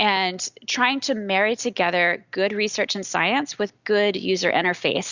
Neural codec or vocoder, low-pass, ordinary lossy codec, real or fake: none; 7.2 kHz; Opus, 64 kbps; real